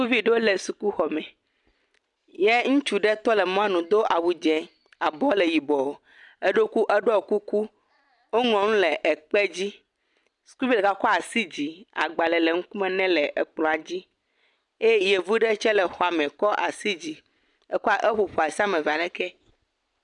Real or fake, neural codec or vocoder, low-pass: real; none; 10.8 kHz